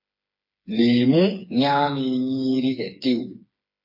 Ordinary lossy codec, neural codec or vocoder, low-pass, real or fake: MP3, 32 kbps; codec, 16 kHz, 4 kbps, FreqCodec, smaller model; 5.4 kHz; fake